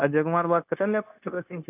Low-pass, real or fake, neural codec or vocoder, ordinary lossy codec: 3.6 kHz; fake; codec, 16 kHz, 0.9 kbps, LongCat-Audio-Codec; none